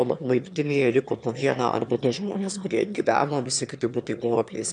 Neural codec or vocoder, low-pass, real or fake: autoencoder, 22.05 kHz, a latent of 192 numbers a frame, VITS, trained on one speaker; 9.9 kHz; fake